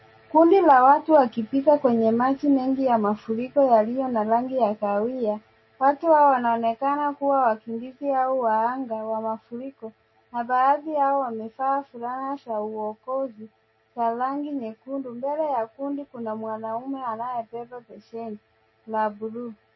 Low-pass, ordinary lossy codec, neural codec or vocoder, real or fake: 7.2 kHz; MP3, 24 kbps; none; real